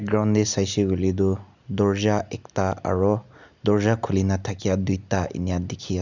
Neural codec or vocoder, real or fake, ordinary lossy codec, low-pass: none; real; none; 7.2 kHz